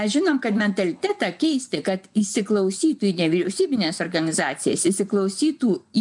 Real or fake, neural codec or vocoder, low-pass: fake; vocoder, 24 kHz, 100 mel bands, Vocos; 10.8 kHz